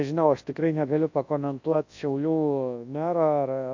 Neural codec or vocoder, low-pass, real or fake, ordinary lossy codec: codec, 24 kHz, 0.9 kbps, WavTokenizer, large speech release; 7.2 kHz; fake; AAC, 48 kbps